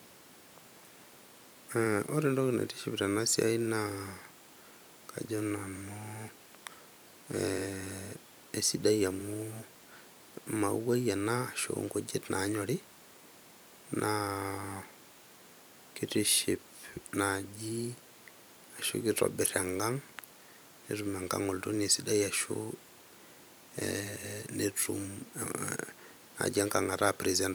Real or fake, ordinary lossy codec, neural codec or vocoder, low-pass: fake; none; vocoder, 44.1 kHz, 128 mel bands every 512 samples, BigVGAN v2; none